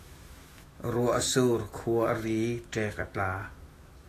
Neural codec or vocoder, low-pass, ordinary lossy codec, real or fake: autoencoder, 48 kHz, 128 numbers a frame, DAC-VAE, trained on Japanese speech; 14.4 kHz; AAC, 48 kbps; fake